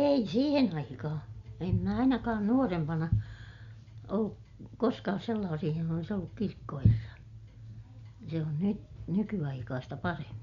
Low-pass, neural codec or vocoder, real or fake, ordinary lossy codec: 7.2 kHz; none; real; Opus, 64 kbps